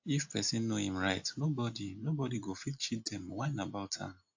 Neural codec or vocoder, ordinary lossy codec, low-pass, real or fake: none; AAC, 48 kbps; 7.2 kHz; real